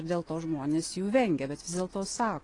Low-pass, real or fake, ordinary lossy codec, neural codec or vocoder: 10.8 kHz; fake; AAC, 32 kbps; vocoder, 24 kHz, 100 mel bands, Vocos